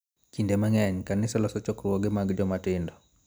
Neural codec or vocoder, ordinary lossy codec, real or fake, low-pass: none; none; real; none